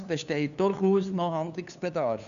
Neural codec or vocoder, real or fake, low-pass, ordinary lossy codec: codec, 16 kHz, 2 kbps, FunCodec, trained on LibriTTS, 25 frames a second; fake; 7.2 kHz; none